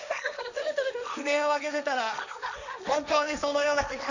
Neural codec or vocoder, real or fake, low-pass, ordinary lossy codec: codec, 16 kHz, 1.1 kbps, Voila-Tokenizer; fake; 7.2 kHz; none